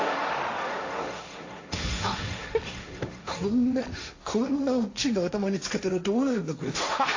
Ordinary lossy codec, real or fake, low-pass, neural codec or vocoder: AAC, 48 kbps; fake; 7.2 kHz; codec, 16 kHz, 1.1 kbps, Voila-Tokenizer